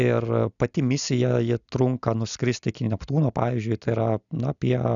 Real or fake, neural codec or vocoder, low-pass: real; none; 7.2 kHz